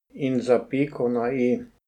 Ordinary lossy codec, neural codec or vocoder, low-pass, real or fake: none; none; 19.8 kHz; real